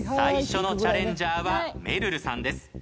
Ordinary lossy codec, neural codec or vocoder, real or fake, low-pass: none; none; real; none